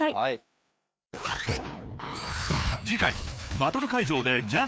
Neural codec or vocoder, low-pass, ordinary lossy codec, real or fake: codec, 16 kHz, 2 kbps, FreqCodec, larger model; none; none; fake